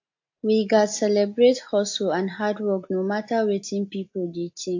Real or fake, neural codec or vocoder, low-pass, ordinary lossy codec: real; none; 7.2 kHz; AAC, 48 kbps